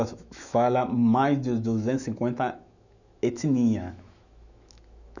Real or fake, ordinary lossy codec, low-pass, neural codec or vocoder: fake; none; 7.2 kHz; autoencoder, 48 kHz, 128 numbers a frame, DAC-VAE, trained on Japanese speech